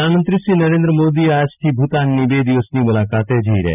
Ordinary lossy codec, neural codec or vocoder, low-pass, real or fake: none; none; 3.6 kHz; real